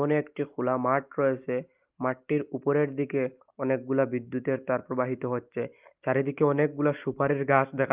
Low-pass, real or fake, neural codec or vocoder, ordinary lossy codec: 3.6 kHz; real; none; Opus, 24 kbps